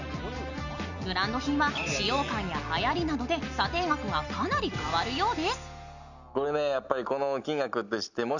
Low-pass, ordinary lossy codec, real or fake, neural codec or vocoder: 7.2 kHz; none; real; none